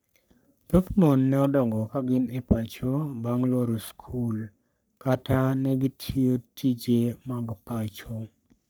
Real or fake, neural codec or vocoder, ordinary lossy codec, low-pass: fake; codec, 44.1 kHz, 3.4 kbps, Pupu-Codec; none; none